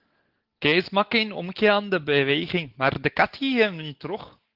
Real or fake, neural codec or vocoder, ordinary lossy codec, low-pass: real; none; Opus, 16 kbps; 5.4 kHz